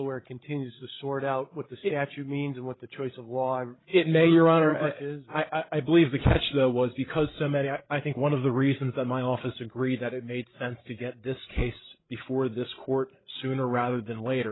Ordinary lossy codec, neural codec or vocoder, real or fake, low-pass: AAC, 16 kbps; codec, 16 kHz, 16 kbps, FreqCodec, larger model; fake; 7.2 kHz